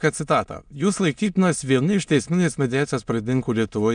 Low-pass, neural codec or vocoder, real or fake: 9.9 kHz; autoencoder, 22.05 kHz, a latent of 192 numbers a frame, VITS, trained on many speakers; fake